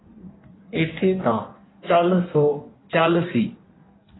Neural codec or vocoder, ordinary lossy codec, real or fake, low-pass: codec, 16 kHz in and 24 kHz out, 1.1 kbps, FireRedTTS-2 codec; AAC, 16 kbps; fake; 7.2 kHz